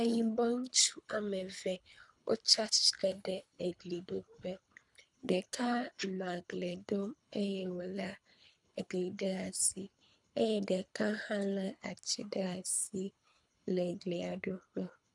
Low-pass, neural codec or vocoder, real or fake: 10.8 kHz; codec, 24 kHz, 3 kbps, HILCodec; fake